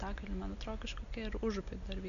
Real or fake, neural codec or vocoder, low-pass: real; none; 7.2 kHz